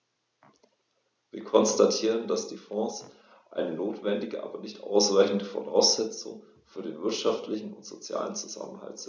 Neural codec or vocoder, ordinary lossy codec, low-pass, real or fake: none; none; none; real